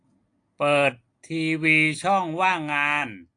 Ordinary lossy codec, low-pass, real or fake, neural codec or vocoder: AAC, 48 kbps; 9.9 kHz; real; none